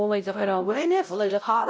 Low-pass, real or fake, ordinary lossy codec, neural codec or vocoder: none; fake; none; codec, 16 kHz, 0.5 kbps, X-Codec, WavLM features, trained on Multilingual LibriSpeech